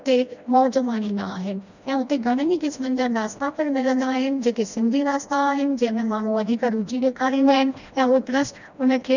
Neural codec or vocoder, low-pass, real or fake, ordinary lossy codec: codec, 16 kHz, 1 kbps, FreqCodec, smaller model; 7.2 kHz; fake; none